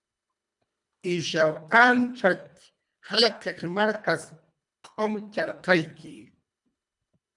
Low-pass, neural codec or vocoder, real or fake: 10.8 kHz; codec, 24 kHz, 1.5 kbps, HILCodec; fake